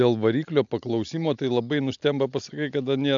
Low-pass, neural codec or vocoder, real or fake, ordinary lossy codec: 7.2 kHz; none; real; AAC, 64 kbps